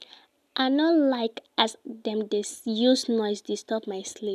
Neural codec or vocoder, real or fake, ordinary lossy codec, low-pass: none; real; none; 14.4 kHz